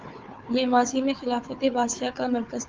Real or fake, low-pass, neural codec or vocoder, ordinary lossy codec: fake; 7.2 kHz; codec, 16 kHz, 4 kbps, FunCodec, trained on Chinese and English, 50 frames a second; Opus, 32 kbps